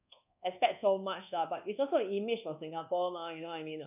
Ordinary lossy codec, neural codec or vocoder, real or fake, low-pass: none; codec, 24 kHz, 1.2 kbps, DualCodec; fake; 3.6 kHz